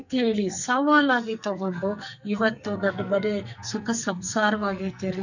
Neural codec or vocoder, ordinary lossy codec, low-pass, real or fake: codec, 44.1 kHz, 2.6 kbps, SNAC; none; 7.2 kHz; fake